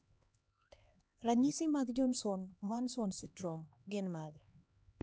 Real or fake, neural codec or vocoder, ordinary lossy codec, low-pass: fake; codec, 16 kHz, 2 kbps, X-Codec, HuBERT features, trained on LibriSpeech; none; none